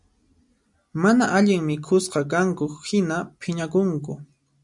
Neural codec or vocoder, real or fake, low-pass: none; real; 10.8 kHz